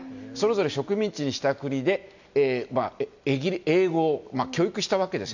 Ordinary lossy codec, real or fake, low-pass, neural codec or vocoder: none; real; 7.2 kHz; none